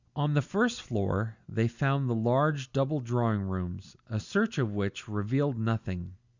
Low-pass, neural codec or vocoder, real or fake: 7.2 kHz; none; real